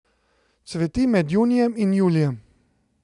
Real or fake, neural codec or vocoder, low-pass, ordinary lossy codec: real; none; 10.8 kHz; none